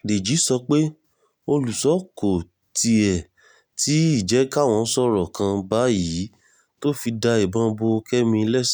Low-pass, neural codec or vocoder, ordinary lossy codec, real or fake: none; none; none; real